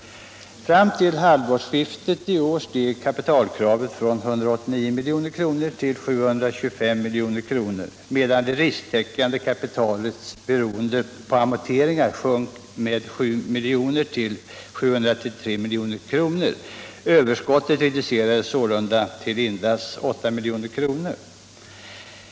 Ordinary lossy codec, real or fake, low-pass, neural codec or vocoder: none; real; none; none